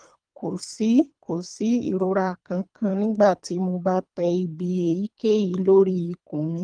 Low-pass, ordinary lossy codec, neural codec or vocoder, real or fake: 9.9 kHz; Opus, 32 kbps; codec, 24 kHz, 3 kbps, HILCodec; fake